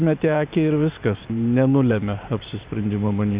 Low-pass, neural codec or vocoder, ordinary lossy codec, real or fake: 3.6 kHz; vocoder, 44.1 kHz, 128 mel bands every 512 samples, BigVGAN v2; Opus, 64 kbps; fake